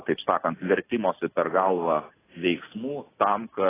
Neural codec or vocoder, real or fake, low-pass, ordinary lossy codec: none; real; 3.6 kHz; AAC, 16 kbps